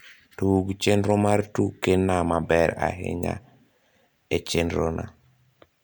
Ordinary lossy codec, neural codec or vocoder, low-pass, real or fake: none; none; none; real